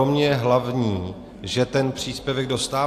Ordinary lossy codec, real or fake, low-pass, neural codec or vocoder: AAC, 64 kbps; real; 14.4 kHz; none